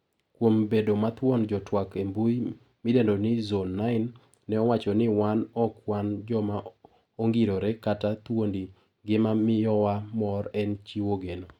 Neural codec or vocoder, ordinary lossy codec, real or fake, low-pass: none; none; real; 19.8 kHz